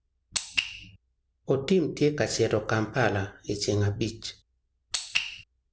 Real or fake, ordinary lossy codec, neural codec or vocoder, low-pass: real; none; none; none